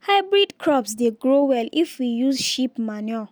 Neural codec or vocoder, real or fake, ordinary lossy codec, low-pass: none; real; none; none